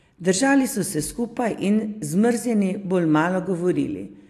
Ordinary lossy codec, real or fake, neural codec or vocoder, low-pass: AAC, 64 kbps; real; none; 14.4 kHz